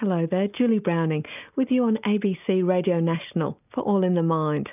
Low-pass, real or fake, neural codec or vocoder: 3.6 kHz; real; none